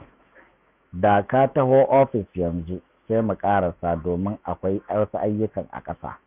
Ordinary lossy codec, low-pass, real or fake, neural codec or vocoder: none; 3.6 kHz; fake; autoencoder, 48 kHz, 128 numbers a frame, DAC-VAE, trained on Japanese speech